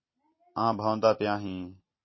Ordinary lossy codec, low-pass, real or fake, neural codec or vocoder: MP3, 24 kbps; 7.2 kHz; real; none